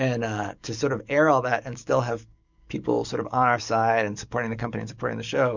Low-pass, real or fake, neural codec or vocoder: 7.2 kHz; fake; codec, 44.1 kHz, 7.8 kbps, DAC